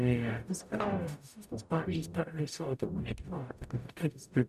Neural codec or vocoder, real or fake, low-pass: codec, 44.1 kHz, 0.9 kbps, DAC; fake; 14.4 kHz